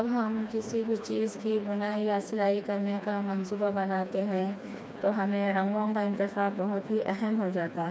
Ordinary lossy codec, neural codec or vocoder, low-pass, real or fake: none; codec, 16 kHz, 2 kbps, FreqCodec, smaller model; none; fake